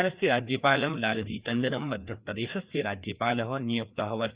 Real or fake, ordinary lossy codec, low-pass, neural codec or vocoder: fake; Opus, 64 kbps; 3.6 kHz; codec, 16 kHz, 2 kbps, FreqCodec, larger model